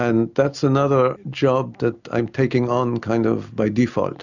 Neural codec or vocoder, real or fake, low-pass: none; real; 7.2 kHz